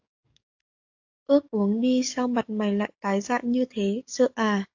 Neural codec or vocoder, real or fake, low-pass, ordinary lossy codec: codec, 44.1 kHz, 7.8 kbps, DAC; fake; 7.2 kHz; AAC, 48 kbps